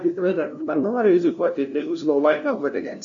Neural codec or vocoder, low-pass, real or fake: codec, 16 kHz, 0.5 kbps, FunCodec, trained on LibriTTS, 25 frames a second; 7.2 kHz; fake